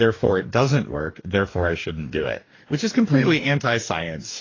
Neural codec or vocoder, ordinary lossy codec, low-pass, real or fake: codec, 44.1 kHz, 2.6 kbps, DAC; AAC, 32 kbps; 7.2 kHz; fake